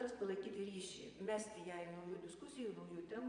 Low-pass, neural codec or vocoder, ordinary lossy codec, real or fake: 9.9 kHz; vocoder, 22.05 kHz, 80 mel bands, Vocos; MP3, 64 kbps; fake